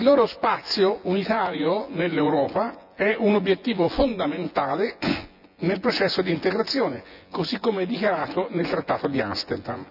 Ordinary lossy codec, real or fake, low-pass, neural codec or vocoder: none; fake; 5.4 kHz; vocoder, 24 kHz, 100 mel bands, Vocos